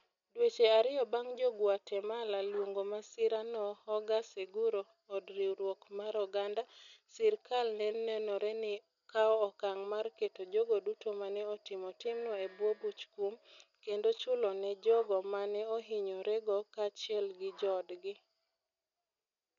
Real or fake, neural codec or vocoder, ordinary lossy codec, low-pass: real; none; none; 7.2 kHz